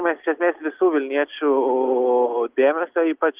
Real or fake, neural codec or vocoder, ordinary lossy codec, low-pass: real; none; Opus, 24 kbps; 3.6 kHz